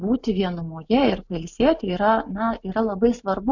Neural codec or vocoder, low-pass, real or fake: none; 7.2 kHz; real